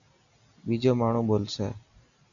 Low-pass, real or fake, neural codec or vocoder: 7.2 kHz; real; none